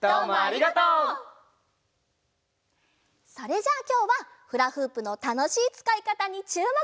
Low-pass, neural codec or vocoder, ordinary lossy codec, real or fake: none; none; none; real